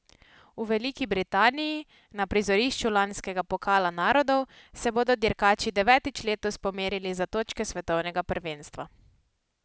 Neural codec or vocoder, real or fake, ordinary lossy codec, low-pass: none; real; none; none